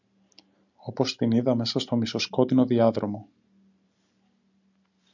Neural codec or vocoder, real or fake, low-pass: none; real; 7.2 kHz